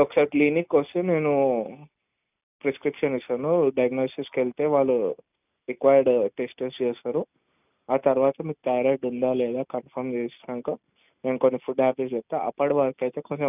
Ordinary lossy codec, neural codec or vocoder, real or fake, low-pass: none; none; real; 3.6 kHz